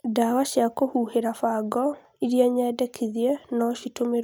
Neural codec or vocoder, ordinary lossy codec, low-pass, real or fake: none; none; none; real